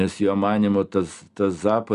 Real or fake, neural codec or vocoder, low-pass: real; none; 10.8 kHz